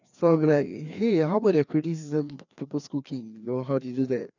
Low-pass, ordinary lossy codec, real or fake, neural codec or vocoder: 7.2 kHz; none; fake; codec, 16 kHz, 2 kbps, FreqCodec, larger model